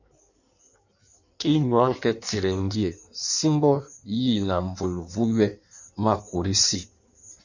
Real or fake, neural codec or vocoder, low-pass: fake; codec, 16 kHz in and 24 kHz out, 1.1 kbps, FireRedTTS-2 codec; 7.2 kHz